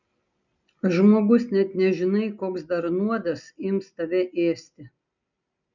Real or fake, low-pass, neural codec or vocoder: real; 7.2 kHz; none